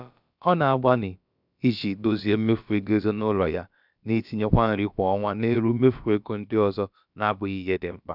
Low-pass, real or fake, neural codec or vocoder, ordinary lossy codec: 5.4 kHz; fake; codec, 16 kHz, about 1 kbps, DyCAST, with the encoder's durations; none